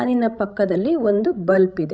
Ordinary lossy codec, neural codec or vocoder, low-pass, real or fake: none; codec, 16 kHz, 16 kbps, FreqCodec, larger model; none; fake